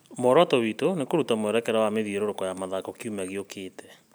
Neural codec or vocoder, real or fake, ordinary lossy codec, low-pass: none; real; none; none